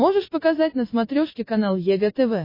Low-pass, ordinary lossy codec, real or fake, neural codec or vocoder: 5.4 kHz; MP3, 24 kbps; real; none